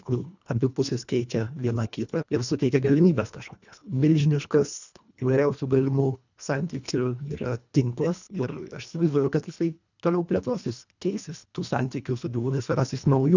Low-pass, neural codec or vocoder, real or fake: 7.2 kHz; codec, 24 kHz, 1.5 kbps, HILCodec; fake